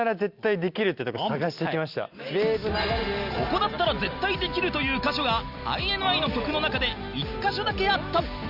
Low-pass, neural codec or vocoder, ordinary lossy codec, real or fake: 5.4 kHz; none; Opus, 64 kbps; real